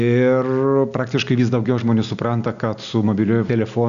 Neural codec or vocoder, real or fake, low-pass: none; real; 7.2 kHz